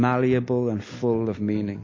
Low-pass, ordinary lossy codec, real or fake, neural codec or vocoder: 7.2 kHz; MP3, 32 kbps; real; none